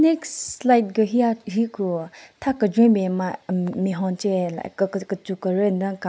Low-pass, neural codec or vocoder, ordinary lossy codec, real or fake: none; none; none; real